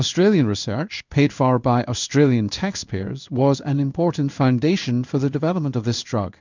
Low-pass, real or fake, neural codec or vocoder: 7.2 kHz; fake; codec, 16 kHz in and 24 kHz out, 1 kbps, XY-Tokenizer